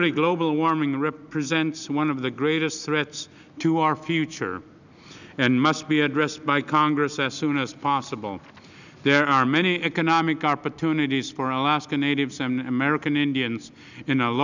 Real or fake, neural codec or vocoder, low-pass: real; none; 7.2 kHz